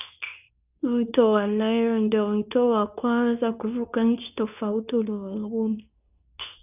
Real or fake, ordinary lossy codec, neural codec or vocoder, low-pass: fake; none; codec, 24 kHz, 0.9 kbps, WavTokenizer, medium speech release version 2; 3.6 kHz